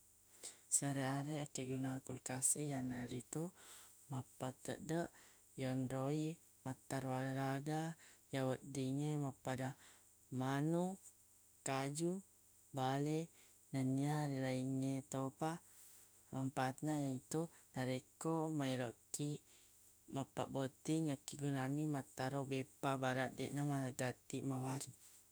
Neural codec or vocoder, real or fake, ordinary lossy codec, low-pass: autoencoder, 48 kHz, 32 numbers a frame, DAC-VAE, trained on Japanese speech; fake; none; none